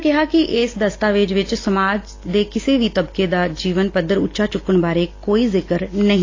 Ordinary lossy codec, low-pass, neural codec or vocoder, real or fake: AAC, 32 kbps; 7.2 kHz; none; real